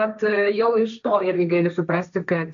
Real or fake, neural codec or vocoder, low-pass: fake; codec, 16 kHz, 1.1 kbps, Voila-Tokenizer; 7.2 kHz